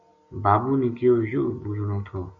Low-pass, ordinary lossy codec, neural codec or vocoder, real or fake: 7.2 kHz; MP3, 48 kbps; none; real